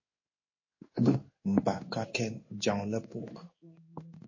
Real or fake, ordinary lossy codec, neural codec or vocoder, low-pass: fake; MP3, 32 kbps; codec, 16 kHz in and 24 kHz out, 1 kbps, XY-Tokenizer; 7.2 kHz